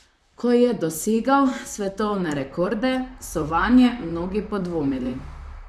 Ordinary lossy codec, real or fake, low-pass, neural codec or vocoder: none; fake; 14.4 kHz; vocoder, 44.1 kHz, 128 mel bands, Pupu-Vocoder